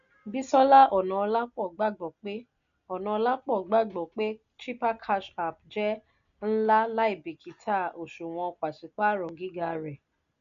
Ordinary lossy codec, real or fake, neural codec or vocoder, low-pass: MP3, 96 kbps; real; none; 7.2 kHz